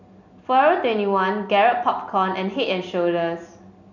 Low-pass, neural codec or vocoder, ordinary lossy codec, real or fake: 7.2 kHz; none; none; real